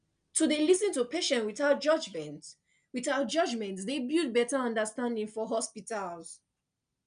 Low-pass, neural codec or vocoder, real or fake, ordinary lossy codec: 9.9 kHz; none; real; none